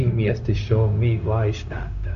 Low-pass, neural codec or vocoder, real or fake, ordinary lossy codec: 7.2 kHz; codec, 16 kHz, 0.4 kbps, LongCat-Audio-Codec; fake; none